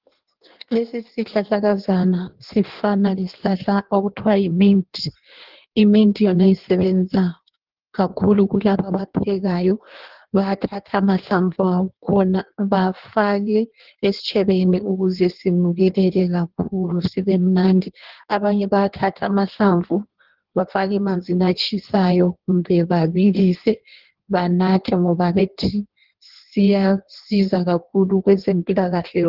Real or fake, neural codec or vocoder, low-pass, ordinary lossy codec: fake; codec, 16 kHz in and 24 kHz out, 1.1 kbps, FireRedTTS-2 codec; 5.4 kHz; Opus, 16 kbps